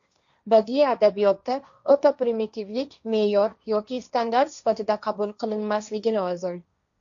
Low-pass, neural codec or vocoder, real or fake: 7.2 kHz; codec, 16 kHz, 1.1 kbps, Voila-Tokenizer; fake